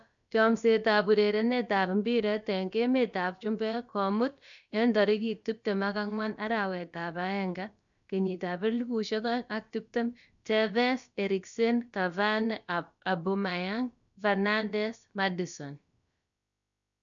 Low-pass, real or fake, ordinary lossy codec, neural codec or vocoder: 7.2 kHz; fake; none; codec, 16 kHz, about 1 kbps, DyCAST, with the encoder's durations